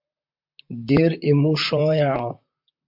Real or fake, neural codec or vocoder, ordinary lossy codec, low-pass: fake; vocoder, 44.1 kHz, 128 mel bands, Pupu-Vocoder; MP3, 48 kbps; 5.4 kHz